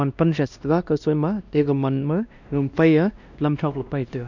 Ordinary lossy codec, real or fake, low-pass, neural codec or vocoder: none; fake; 7.2 kHz; codec, 16 kHz, 1 kbps, X-Codec, WavLM features, trained on Multilingual LibriSpeech